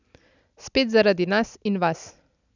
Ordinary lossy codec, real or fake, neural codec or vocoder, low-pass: none; real; none; 7.2 kHz